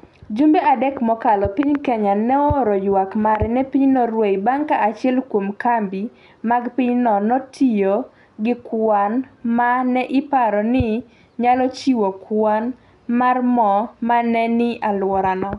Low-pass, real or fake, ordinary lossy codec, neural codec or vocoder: 10.8 kHz; real; MP3, 96 kbps; none